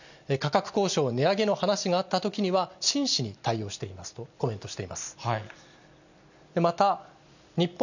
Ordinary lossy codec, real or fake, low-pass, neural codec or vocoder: none; real; 7.2 kHz; none